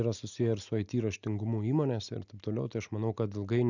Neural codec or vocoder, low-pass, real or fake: none; 7.2 kHz; real